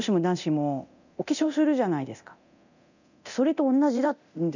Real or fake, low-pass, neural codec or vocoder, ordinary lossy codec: fake; 7.2 kHz; codec, 24 kHz, 0.9 kbps, DualCodec; none